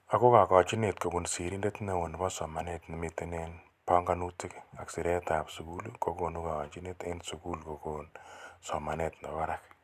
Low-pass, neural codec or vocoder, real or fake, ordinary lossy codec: 14.4 kHz; none; real; none